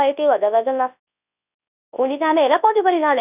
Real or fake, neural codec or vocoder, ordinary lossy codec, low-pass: fake; codec, 24 kHz, 0.9 kbps, WavTokenizer, large speech release; none; 3.6 kHz